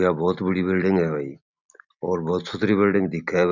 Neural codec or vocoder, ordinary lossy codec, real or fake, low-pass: none; none; real; 7.2 kHz